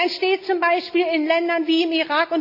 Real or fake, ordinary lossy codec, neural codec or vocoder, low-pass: real; none; none; 5.4 kHz